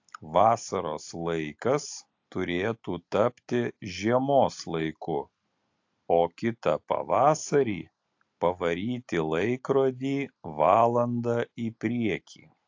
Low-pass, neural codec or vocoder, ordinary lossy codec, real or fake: 7.2 kHz; none; AAC, 48 kbps; real